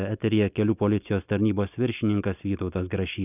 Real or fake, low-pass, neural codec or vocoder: real; 3.6 kHz; none